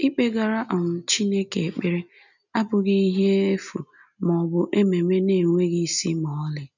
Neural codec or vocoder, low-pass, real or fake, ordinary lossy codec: none; 7.2 kHz; real; none